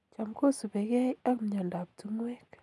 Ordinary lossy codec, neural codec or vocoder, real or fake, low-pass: none; none; real; none